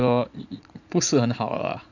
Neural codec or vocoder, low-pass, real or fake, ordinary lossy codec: vocoder, 44.1 kHz, 80 mel bands, Vocos; 7.2 kHz; fake; none